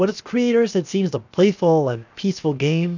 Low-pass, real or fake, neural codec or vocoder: 7.2 kHz; fake; codec, 16 kHz, 0.7 kbps, FocalCodec